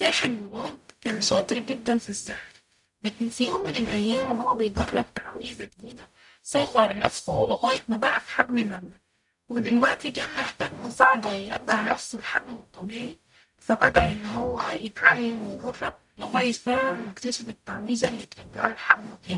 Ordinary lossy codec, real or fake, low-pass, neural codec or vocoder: none; fake; 10.8 kHz; codec, 44.1 kHz, 0.9 kbps, DAC